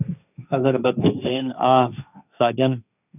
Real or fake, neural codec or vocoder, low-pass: fake; codec, 16 kHz, 1.1 kbps, Voila-Tokenizer; 3.6 kHz